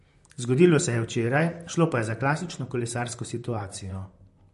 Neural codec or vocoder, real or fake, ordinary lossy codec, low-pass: vocoder, 44.1 kHz, 128 mel bands, Pupu-Vocoder; fake; MP3, 48 kbps; 14.4 kHz